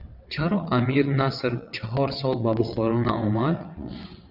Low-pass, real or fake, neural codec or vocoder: 5.4 kHz; fake; vocoder, 22.05 kHz, 80 mel bands, WaveNeXt